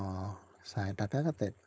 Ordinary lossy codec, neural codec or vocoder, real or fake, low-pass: none; codec, 16 kHz, 4.8 kbps, FACodec; fake; none